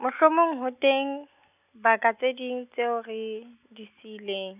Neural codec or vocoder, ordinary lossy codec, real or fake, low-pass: none; none; real; 3.6 kHz